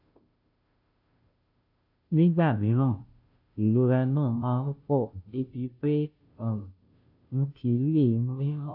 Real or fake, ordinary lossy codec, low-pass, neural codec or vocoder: fake; AAC, 48 kbps; 5.4 kHz; codec, 16 kHz, 0.5 kbps, FunCodec, trained on Chinese and English, 25 frames a second